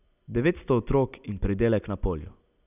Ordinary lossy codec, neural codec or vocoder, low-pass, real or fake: none; none; 3.6 kHz; real